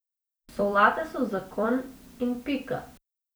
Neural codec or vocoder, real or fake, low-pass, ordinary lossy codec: vocoder, 44.1 kHz, 128 mel bands every 256 samples, BigVGAN v2; fake; none; none